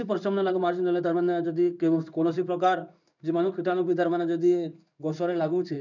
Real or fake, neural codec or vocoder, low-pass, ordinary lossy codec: fake; codec, 16 kHz in and 24 kHz out, 1 kbps, XY-Tokenizer; 7.2 kHz; none